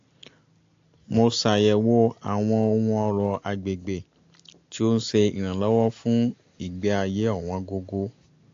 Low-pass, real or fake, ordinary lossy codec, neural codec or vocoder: 7.2 kHz; real; AAC, 48 kbps; none